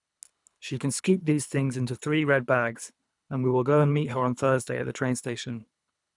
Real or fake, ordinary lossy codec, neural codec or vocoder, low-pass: fake; none; codec, 24 kHz, 3 kbps, HILCodec; 10.8 kHz